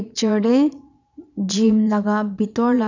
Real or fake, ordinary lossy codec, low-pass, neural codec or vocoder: fake; none; 7.2 kHz; vocoder, 22.05 kHz, 80 mel bands, Vocos